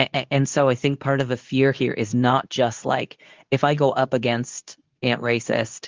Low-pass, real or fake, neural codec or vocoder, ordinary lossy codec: 7.2 kHz; fake; codec, 24 kHz, 0.9 kbps, WavTokenizer, medium speech release version 2; Opus, 32 kbps